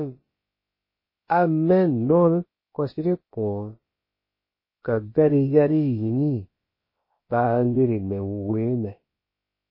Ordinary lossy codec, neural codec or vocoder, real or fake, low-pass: MP3, 24 kbps; codec, 16 kHz, about 1 kbps, DyCAST, with the encoder's durations; fake; 5.4 kHz